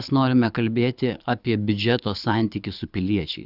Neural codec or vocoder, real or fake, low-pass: codec, 24 kHz, 6 kbps, HILCodec; fake; 5.4 kHz